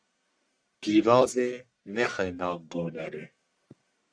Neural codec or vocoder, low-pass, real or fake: codec, 44.1 kHz, 1.7 kbps, Pupu-Codec; 9.9 kHz; fake